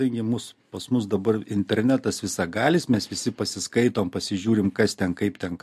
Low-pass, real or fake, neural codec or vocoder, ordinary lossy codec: 14.4 kHz; real; none; MP3, 64 kbps